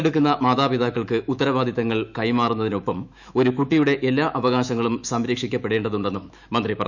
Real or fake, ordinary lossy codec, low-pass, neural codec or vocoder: fake; none; 7.2 kHz; autoencoder, 48 kHz, 128 numbers a frame, DAC-VAE, trained on Japanese speech